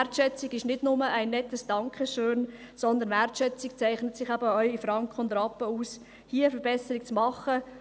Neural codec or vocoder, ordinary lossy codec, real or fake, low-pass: none; none; real; none